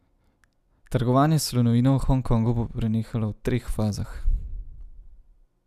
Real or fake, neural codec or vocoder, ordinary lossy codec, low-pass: real; none; none; 14.4 kHz